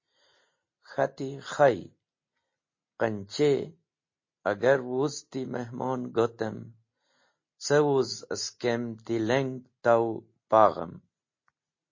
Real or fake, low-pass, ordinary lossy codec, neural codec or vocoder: real; 7.2 kHz; MP3, 32 kbps; none